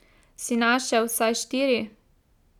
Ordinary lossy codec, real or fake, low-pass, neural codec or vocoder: none; real; 19.8 kHz; none